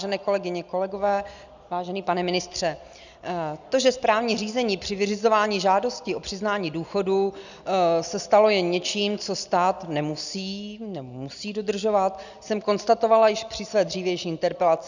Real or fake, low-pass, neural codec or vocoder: real; 7.2 kHz; none